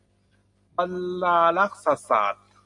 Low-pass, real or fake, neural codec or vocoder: 10.8 kHz; real; none